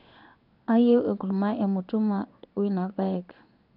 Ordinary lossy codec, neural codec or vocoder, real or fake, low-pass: none; codec, 16 kHz in and 24 kHz out, 1 kbps, XY-Tokenizer; fake; 5.4 kHz